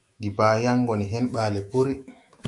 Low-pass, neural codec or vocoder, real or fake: 10.8 kHz; autoencoder, 48 kHz, 128 numbers a frame, DAC-VAE, trained on Japanese speech; fake